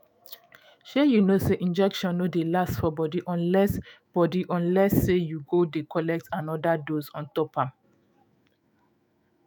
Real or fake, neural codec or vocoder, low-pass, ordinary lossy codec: fake; autoencoder, 48 kHz, 128 numbers a frame, DAC-VAE, trained on Japanese speech; none; none